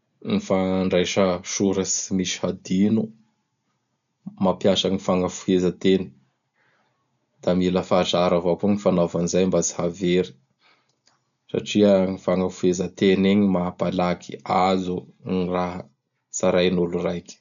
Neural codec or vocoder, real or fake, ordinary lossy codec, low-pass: none; real; none; 7.2 kHz